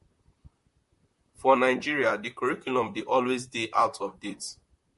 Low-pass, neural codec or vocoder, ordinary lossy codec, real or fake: 14.4 kHz; vocoder, 44.1 kHz, 128 mel bands, Pupu-Vocoder; MP3, 48 kbps; fake